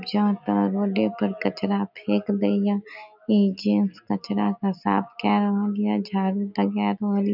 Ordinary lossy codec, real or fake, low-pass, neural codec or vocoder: none; real; 5.4 kHz; none